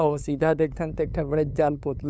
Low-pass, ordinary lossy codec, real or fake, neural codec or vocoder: none; none; fake; codec, 16 kHz, 2 kbps, FunCodec, trained on LibriTTS, 25 frames a second